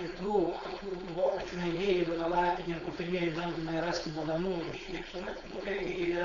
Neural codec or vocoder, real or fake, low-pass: codec, 16 kHz, 4.8 kbps, FACodec; fake; 7.2 kHz